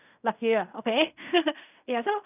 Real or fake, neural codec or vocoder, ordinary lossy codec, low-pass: fake; codec, 16 kHz in and 24 kHz out, 0.4 kbps, LongCat-Audio-Codec, fine tuned four codebook decoder; none; 3.6 kHz